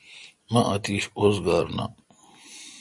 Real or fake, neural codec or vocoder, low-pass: real; none; 10.8 kHz